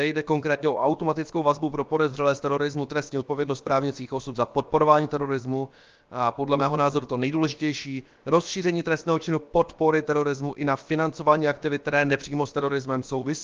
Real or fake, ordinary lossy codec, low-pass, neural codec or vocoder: fake; Opus, 32 kbps; 7.2 kHz; codec, 16 kHz, about 1 kbps, DyCAST, with the encoder's durations